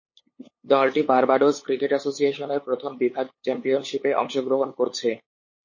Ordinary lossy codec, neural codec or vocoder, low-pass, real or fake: MP3, 32 kbps; codec, 16 kHz, 8 kbps, FunCodec, trained on LibriTTS, 25 frames a second; 7.2 kHz; fake